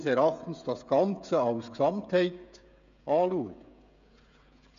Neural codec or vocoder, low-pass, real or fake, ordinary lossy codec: codec, 16 kHz, 16 kbps, FreqCodec, smaller model; 7.2 kHz; fake; MP3, 64 kbps